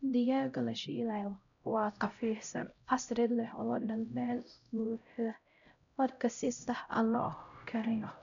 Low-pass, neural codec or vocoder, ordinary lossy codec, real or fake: 7.2 kHz; codec, 16 kHz, 0.5 kbps, X-Codec, HuBERT features, trained on LibriSpeech; none; fake